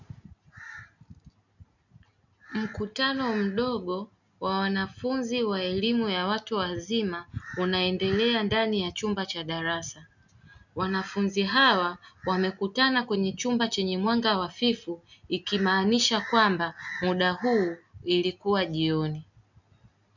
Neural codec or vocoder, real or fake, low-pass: none; real; 7.2 kHz